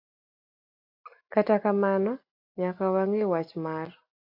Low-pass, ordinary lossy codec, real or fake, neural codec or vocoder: 5.4 kHz; AAC, 32 kbps; real; none